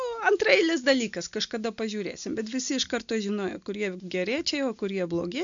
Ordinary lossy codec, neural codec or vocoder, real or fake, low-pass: MP3, 64 kbps; none; real; 7.2 kHz